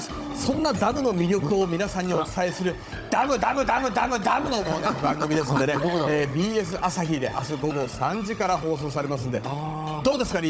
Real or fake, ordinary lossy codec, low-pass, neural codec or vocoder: fake; none; none; codec, 16 kHz, 16 kbps, FunCodec, trained on Chinese and English, 50 frames a second